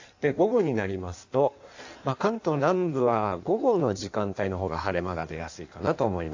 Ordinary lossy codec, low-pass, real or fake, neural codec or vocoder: AAC, 48 kbps; 7.2 kHz; fake; codec, 16 kHz in and 24 kHz out, 1.1 kbps, FireRedTTS-2 codec